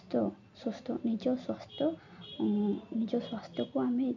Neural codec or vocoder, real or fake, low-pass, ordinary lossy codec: none; real; 7.2 kHz; none